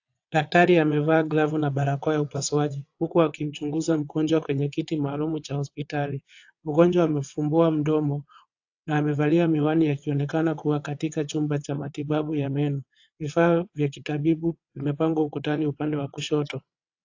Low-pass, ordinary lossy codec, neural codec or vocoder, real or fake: 7.2 kHz; AAC, 48 kbps; vocoder, 22.05 kHz, 80 mel bands, WaveNeXt; fake